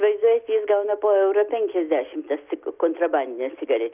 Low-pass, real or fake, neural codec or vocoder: 3.6 kHz; real; none